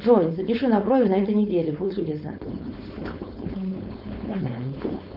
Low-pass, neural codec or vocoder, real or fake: 5.4 kHz; codec, 16 kHz, 4.8 kbps, FACodec; fake